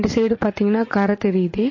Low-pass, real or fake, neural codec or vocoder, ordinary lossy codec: 7.2 kHz; fake; vocoder, 44.1 kHz, 80 mel bands, Vocos; MP3, 32 kbps